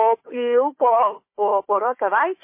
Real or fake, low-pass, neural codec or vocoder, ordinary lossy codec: fake; 3.6 kHz; codec, 16 kHz, 4 kbps, FunCodec, trained on Chinese and English, 50 frames a second; MP3, 24 kbps